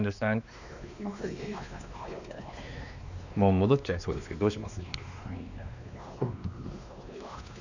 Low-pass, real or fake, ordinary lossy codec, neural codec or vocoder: 7.2 kHz; fake; none; codec, 16 kHz, 2 kbps, X-Codec, WavLM features, trained on Multilingual LibriSpeech